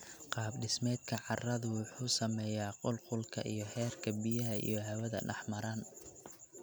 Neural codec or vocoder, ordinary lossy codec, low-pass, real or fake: none; none; none; real